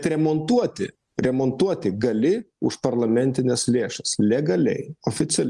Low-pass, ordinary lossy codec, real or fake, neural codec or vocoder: 10.8 kHz; Opus, 32 kbps; real; none